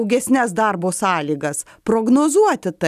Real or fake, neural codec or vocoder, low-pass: real; none; 14.4 kHz